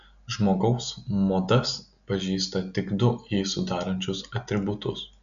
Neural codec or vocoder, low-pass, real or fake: none; 7.2 kHz; real